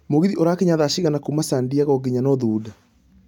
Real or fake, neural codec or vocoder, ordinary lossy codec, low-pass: real; none; none; 19.8 kHz